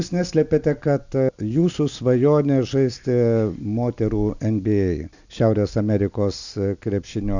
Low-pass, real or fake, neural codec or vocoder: 7.2 kHz; real; none